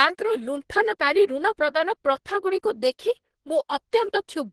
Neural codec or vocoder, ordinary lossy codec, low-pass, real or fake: codec, 24 kHz, 1 kbps, SNAC; Opus, 16 kbps; 10.8 kHz; fake